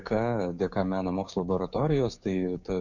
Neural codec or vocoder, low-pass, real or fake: codec, 16 kHz, 6 kbps, DAC; 7.2 kHz; fake